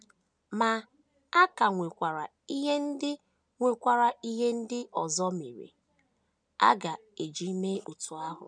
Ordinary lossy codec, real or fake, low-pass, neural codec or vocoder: none; real; 9.9 kHz; none